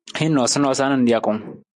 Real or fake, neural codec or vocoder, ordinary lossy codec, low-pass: real; none; MP3, 64 kbps; 10.8 kHz